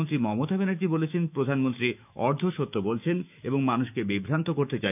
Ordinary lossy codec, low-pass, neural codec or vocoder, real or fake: none; 3.6 kHz; autoencoder, 48 kHz, 128 numbers a frame, DAC-VAE, trained on Japanese speech; fake